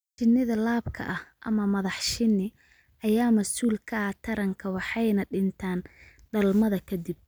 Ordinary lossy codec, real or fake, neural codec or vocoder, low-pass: none; real; none; none